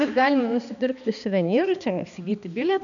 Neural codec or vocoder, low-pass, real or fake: codec, 16 kHz, 2 kbps, X-Codec, HuBERT features, trained on balanced general audio; 7.2 kHz; fake